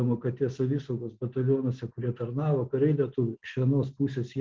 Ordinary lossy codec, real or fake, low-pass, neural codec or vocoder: Opus, 16 kbps; real; 7.2 kHz; none